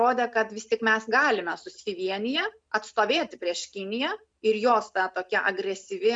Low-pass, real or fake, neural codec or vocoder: 9.9 kHz; real; none